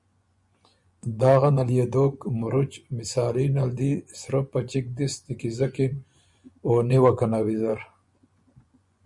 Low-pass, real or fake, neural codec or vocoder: 10.8 kHz; fake; vocoder, 24 kHz, 100 mel bands, Vocos